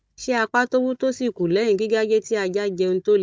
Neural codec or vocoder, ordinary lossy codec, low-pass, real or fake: codec, 16 kHz, 16 kbps, FunCodec, trained on Chinese and English, 50 frames a second; none; none; fake